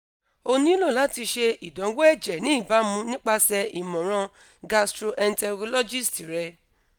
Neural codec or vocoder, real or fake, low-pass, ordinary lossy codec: none; real; none; none